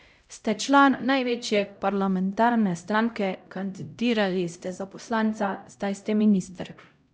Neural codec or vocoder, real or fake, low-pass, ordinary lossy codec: codec, 16 kHz, 0.5 kbps, X-Codec, HuBERT features, trained on LibriSpeech; fake; none; none